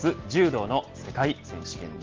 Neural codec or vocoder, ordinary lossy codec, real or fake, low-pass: none; Opus, 16 kbps; real; 7.2 kHz